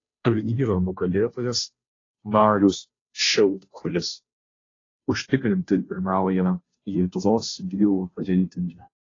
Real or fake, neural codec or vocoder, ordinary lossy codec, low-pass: fake; codec, 16 kHz, 0.5 kbps, FunCodec, trained on Chinese and English, 25 frames a second; AAC, 32 kbps; 7.2 kHz